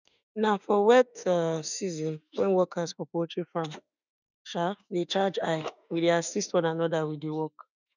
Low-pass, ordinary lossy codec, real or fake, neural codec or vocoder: 7.2 kHz; none; fake; autoencoder, 48 kHz, 32 numbers a frame, DAC-VAE, trained on Japanese speech